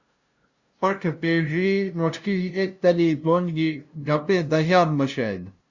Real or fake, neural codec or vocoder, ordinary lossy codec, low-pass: fake; codec, 16 kHz, 0.5 kbps, FunCodec, trained on LibriTTS, 25 frames a second; Opus, 64 kbps; 7.2 kHz